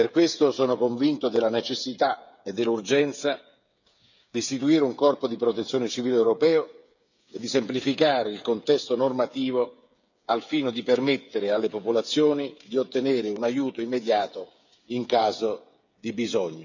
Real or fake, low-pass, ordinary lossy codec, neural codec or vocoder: fake; 7.2 kHz; none; codec, 16 kHz, 8 kbps, FreqCodec, smaller model